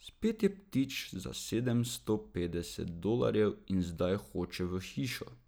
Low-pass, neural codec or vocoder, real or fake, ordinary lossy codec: none; none; real; none